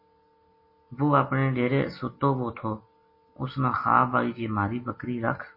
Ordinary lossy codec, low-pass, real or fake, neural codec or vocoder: MP3, 24 kbps; 5.4 kHz; real; none